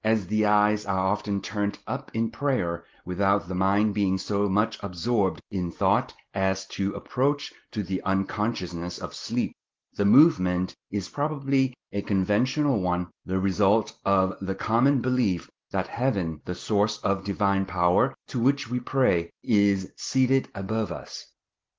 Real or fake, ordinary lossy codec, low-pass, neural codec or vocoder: real; Opus, 16 kbps; 7.2 kHz; none